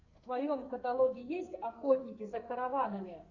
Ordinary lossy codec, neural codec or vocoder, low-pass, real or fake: Opus, 64 kbps; codec, 44.1 kHz, 2.6 kbps, SNAC; 7.2 kHz; fake